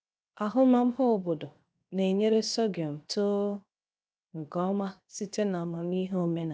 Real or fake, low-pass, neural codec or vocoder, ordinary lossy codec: fake; none; codec, 16 kHz, 0.7 kbps, FocalCodec; none